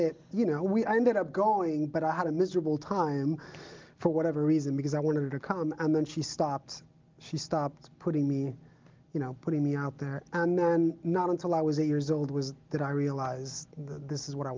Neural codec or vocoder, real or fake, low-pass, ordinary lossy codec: none; real; 7.2 kHz; Opus, 32 kbps